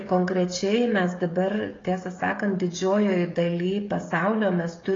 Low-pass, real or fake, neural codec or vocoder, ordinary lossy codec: 7.2 kHz; fake; codec, 16 kHz, 16 kbps, FreqCodec, smaller model; AAC, 32 kbps